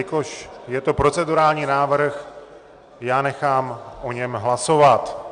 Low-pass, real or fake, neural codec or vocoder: 9.9 kHz; real; none